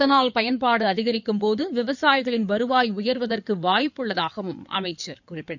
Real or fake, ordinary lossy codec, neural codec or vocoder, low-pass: fake; none; codec, 16 kHz in and 24 kHz out, 2.2 kbps, FireRedTTS-2 codec; 7.2 kHz